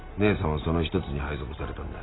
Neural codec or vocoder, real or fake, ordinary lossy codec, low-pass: none; real; AAC, 16 kbps; 7.2 kHz